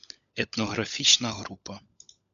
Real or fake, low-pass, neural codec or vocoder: fake; 7.2 kHz; codec, 16 kHz, 16 kbps, FunCodec, trained on LibriTTS, 50 frames a second